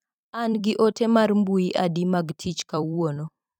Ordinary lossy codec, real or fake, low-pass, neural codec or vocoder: none; fake; 19.8 kHz; vocoder, 44.1 kHz, 128 mel bands every 256 samples, BigVGAN v2